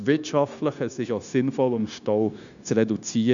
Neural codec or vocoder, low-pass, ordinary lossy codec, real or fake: codec, 16 kHz, 0.9 kbps, LongCat-Audio-Codec; 7.2 kHz; none; fake